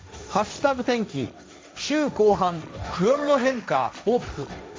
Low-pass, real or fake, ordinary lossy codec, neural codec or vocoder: none; fake; none; codec, 16 kHz, 1.1 kbps, Voila-Tokenizer